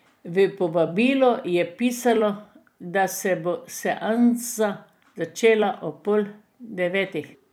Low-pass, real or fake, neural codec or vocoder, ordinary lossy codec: none; fake; vocoder, 44.1 kHz, 128 mel bands every 256 samples, BigVGAN v2; none